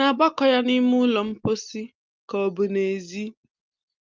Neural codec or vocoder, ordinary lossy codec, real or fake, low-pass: none; Opus, 24 kbps; real; 7.2 kHz